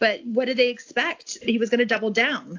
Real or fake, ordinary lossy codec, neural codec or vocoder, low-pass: real; AAC, 48 kbps; none; 7.2 kHz